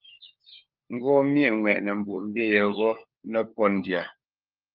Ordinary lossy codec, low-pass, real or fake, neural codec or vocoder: Opus, 24 kbps; 5.4 kHz; fake; codec, 16 kHz, 4 kbps, FunCodec, trained on LibriTTS, 50 frames a second